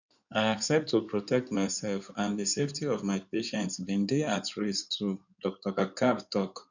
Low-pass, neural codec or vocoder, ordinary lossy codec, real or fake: 7.2 kHz; codec, 16 kHz in and 24 kHz out, 2.2 kbps, FireRedTTS-2 codec; none; fake